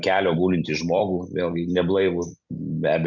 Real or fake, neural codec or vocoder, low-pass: real; none; 7.2 kHz